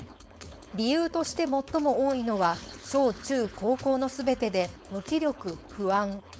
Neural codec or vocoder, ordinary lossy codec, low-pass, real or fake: codec, 16 kHz, 4.8 kbps, FACodec; none; none; fake